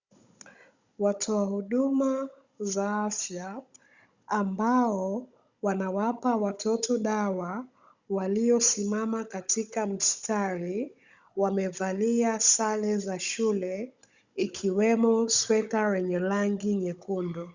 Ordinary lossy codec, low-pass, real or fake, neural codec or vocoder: Opus, 64 kbps; 7.2 kHz; fake; codec, 16 kHz, 16 kbps, FunCodec, trained on Chinese and English, 50 frames a second